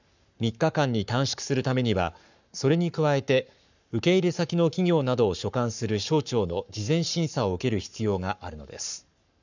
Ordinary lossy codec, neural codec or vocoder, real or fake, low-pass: none; codec, 44.1 kHz, 7.8 kbps, Pupu-Codec; fake; 7.2 kHz